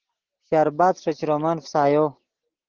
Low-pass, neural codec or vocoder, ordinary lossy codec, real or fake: 7.2 kHz; none; Opus, 16 kbps; real